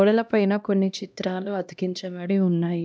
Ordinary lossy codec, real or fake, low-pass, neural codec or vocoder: none; fake; none; codec, 16 kHz, 1 kbps, X-Codec, HuBERT features, trained on LibriSpeech